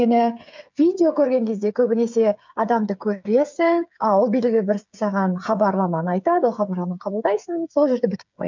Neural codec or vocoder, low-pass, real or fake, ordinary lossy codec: codec, 16 kHz, 8 kbps, FreqCodec, smaller model; 7.2 kHz; fake; MP3, 64 kbps